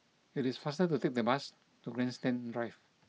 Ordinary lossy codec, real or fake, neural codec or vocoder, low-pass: none; real; none; none